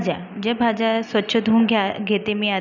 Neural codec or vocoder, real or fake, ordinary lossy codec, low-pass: none; real; none; 7.2 kHz